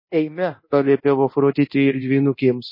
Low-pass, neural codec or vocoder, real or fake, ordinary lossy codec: 5.4 kHz; codec, 24 kHz, 0.9 kbps, DualCodec; fake; MP3, 24 kbps